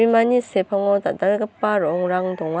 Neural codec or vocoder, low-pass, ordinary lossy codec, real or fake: none; none; none; real